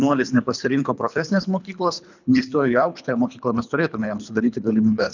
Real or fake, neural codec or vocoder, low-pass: fake; codec, 24 kHz, 3 kbps, HILCodec; 7.2 kHz